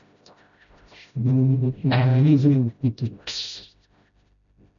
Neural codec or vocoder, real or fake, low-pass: codec, 16 kHz, 0.5 kbps, FreqCodec, smaller model; fake; 7.2 kHz